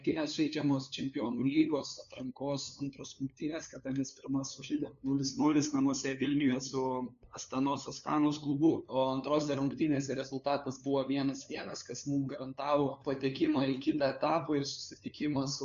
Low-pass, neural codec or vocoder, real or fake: 7.2 kHz; codec, 16 kHz, 2 kbps, FunCodec, trained on LibriTTS, 25 frames a second; fake